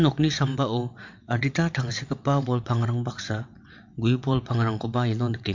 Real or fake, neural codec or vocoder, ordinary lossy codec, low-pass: fake; vocoder, 22.05 kHz, 80 mel bands, WaveNeXt; MP3, 48 kbps; 7.2 kHz